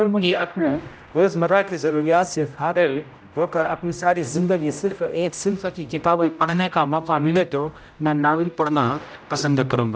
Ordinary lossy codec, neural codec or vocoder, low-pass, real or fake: none; codec, 16 kHz, 0.5 kbps, X-Codec, HuBERT features, trained on general audio; none; fake